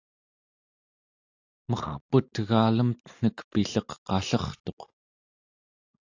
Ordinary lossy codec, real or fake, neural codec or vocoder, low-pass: AAC, 48 kbps; real; none; 7.2 kHz